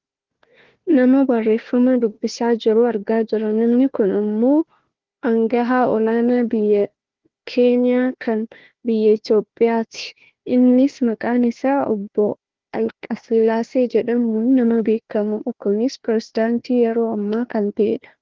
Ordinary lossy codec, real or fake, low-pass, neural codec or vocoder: Opus, 16 kbps; fake; 7.2 kHz; codec, 16 kHz, 1 kbps, FunCodec, trained on Chinese and English, 50 frames a second